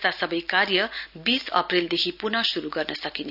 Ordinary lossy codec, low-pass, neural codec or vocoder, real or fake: none; 5.4 kHz; none; real